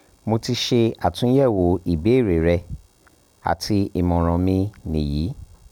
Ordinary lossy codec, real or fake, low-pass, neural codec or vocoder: none; real; 19.8 kHz; none